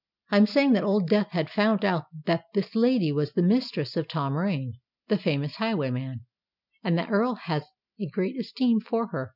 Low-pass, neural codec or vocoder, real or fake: 5.4 kHz; none; real